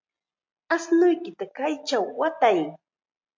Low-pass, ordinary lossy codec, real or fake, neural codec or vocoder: 7.2 kHz; MP3, 48 kbps; real; none